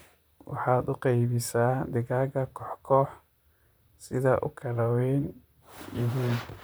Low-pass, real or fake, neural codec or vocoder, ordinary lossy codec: none; fake; vocoder, 44.1 kHz, 128 mel bands, Pupu-Vocoder; none